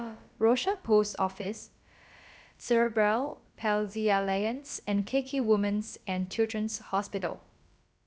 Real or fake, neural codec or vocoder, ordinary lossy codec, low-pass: fake; codec, 16 kHz, about 1 kbps, DyCAST, with the encoder's durations; none; none